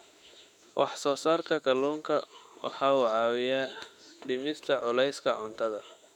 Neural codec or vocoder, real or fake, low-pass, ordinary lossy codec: autoencoder, 48 kHz, 32 numbers a frame, DAC-VAE, trained on Japanese speech; fake; 19.8 kHz; none